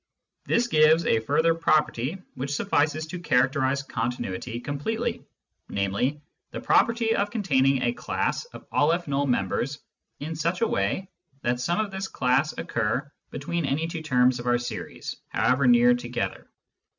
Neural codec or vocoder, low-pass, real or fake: none; 7.2 kHz; real